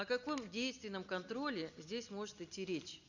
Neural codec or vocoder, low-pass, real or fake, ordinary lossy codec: none; 7.2 kHz; real; none